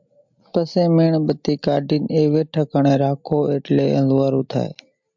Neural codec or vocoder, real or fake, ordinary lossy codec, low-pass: none; real; MP3, 64 kbps; 7.2 kHz